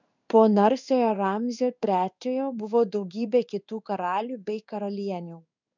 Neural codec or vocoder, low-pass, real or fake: codec, 16 kHz in and 24 kHz out, 1 kbps, XY-Tokenizer; 7.2 kHz; fake